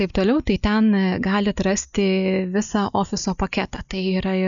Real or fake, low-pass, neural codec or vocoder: real; 7.2 kHz; none